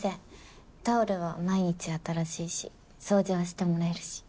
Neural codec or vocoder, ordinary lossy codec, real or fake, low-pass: none; none; real; none